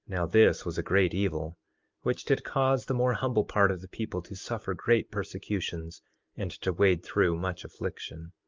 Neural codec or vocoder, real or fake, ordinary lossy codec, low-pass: none; real; Opus, 32 kbps; 7.2 kHz